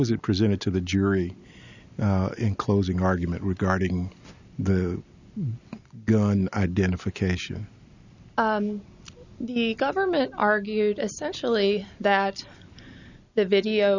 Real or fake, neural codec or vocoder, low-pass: real; none; 7.2 kHz